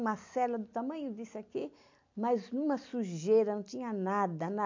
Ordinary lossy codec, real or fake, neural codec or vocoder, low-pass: MP3, 48 kbps; real; none; 7.2 kHz